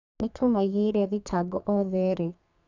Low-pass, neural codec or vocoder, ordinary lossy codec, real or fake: 7.2 kHz; codec, 32 kHz, 1.9 kbps, SNAC; none; fake